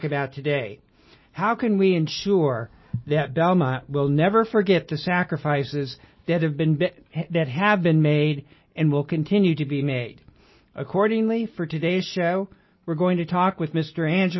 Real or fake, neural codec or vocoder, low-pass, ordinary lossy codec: real; none; 7.2 kHz; MP3, 24 kbps